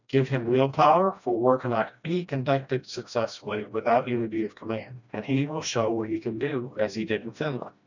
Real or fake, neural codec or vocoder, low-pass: fake; codec, 16 kHz, 1 kbps, FreqCodec, smaller model; 7.2 kHz